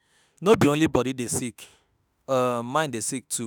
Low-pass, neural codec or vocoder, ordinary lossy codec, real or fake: none; autoencoder, 48 kHz, 32 numbers a frame, DAC-VAE, trained on Japanese speech; none; fake